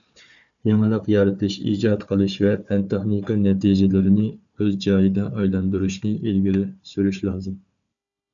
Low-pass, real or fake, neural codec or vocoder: 7.2 kHz; fake; codec, 16 kHz, 4 kbps, FunCodec, trained on Chinese and English, 50 frames a second